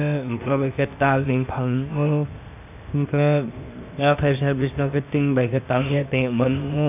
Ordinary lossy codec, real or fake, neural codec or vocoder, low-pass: none; fake; codec, 16 kHz, 0.8 kbps, ZipCodec; 3.6 kHz